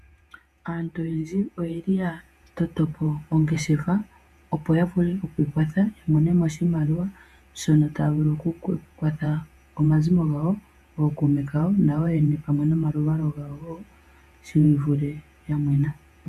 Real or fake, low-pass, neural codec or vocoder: fake; 14.4 kHz; vocoder, 48 kHz, 128 mel bands, Vocos